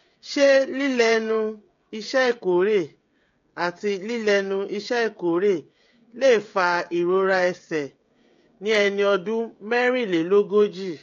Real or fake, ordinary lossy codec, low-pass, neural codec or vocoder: fake; AAC, 48 kbps; 7.2 kHz; codec, 16 kHz, 16 kbps, FreqCodec, smaller model